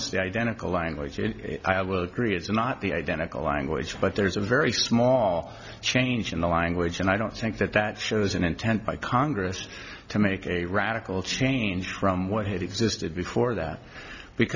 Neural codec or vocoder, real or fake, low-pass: none; real; 7.2 kHz